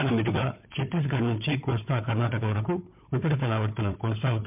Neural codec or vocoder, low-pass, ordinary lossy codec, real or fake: codec, 16 kHz, 4 kbps, FunCodec, trained on Chinese and English, 50 frames a second; 3.6 kHz; MP3, 32 kbps; fake